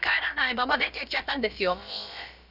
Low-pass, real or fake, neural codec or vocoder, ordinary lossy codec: 5.4 kHz; fake; codec, 16 kHz, about 1 kbps, DyCAST, with the encoder's durations; none